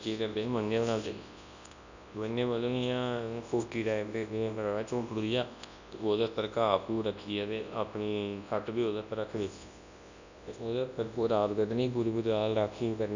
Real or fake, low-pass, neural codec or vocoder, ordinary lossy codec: fake; 7.2 kHz; codec, 24 kHz, 0.9 kbps, WavTokenizer, large speech release; none